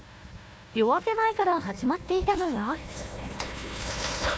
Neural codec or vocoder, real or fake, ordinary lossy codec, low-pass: codec, 16 kHz, 1 kbps, FunCodec, trained on Chinese and English, 50 frames a second; fake; none; none